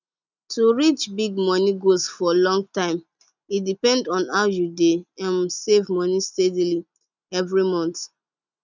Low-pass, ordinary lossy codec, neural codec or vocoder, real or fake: 7.2 kHz; none; none; real